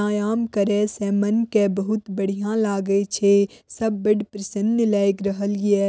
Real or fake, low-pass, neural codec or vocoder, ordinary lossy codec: real; none; none; none